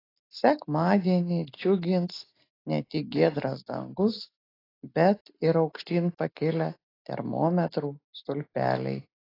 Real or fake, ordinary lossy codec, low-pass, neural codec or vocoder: real; AAC, 24 kbps; 5.4 kHz; none